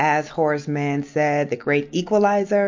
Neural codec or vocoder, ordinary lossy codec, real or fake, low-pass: none; MP3, 48 kbps; real; 7.2 kHz